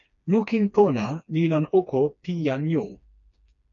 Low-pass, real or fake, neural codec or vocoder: 7.2 kHz; fake; codec, 16 kHz, 2 kbps, FreqCodec, smaller model